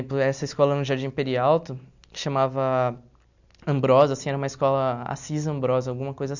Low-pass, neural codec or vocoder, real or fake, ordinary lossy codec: 7.2 kHz; none; real; none